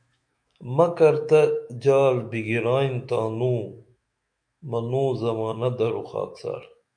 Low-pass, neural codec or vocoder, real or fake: 9.9 kHz; autoencoder, 48 kHz, 128 numbers a frame, DAC-VAE, trained on Japanese speech; fake